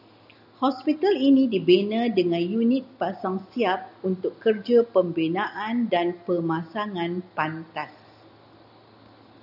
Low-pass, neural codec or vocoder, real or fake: 5.4 kHz; none; real